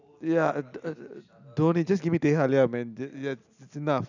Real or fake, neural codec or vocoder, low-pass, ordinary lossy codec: real; none; 7.2 kHz; none